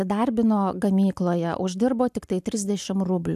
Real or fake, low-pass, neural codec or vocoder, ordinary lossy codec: real; 14.4 kHz; none; AAC, 96 kbps